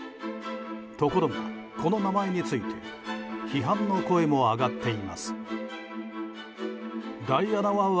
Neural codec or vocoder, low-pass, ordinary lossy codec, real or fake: none; none; none; real